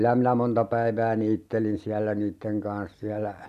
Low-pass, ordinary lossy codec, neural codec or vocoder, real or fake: 14.4 kHz; none; none; real